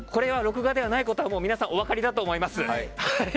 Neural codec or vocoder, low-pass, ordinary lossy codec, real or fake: none; none; none; real